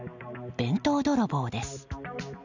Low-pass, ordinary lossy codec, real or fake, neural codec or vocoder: 7.2 kHz; none; real; none